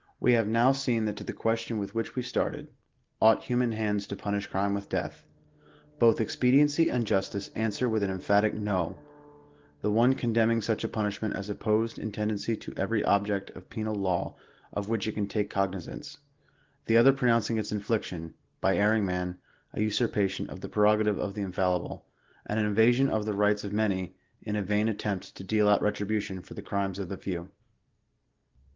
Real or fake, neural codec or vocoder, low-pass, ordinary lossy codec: real; none; 7.2 kHz; Opus, 16 kbps